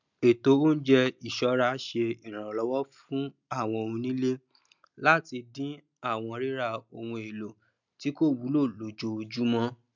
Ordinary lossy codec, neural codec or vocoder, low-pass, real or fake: none; none; 7.2 kHz; real